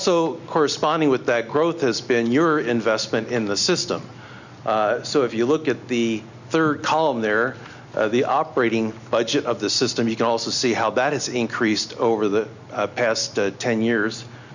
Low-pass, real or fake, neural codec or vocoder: 7.2 kHz; real; none